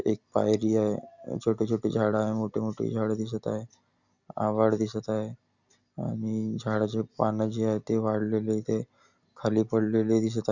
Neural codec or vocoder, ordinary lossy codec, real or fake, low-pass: none; none; real; 7.2 kHz